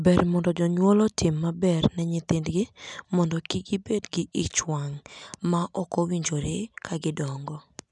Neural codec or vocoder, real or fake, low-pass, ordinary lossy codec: none; real; 10.8 kHz; none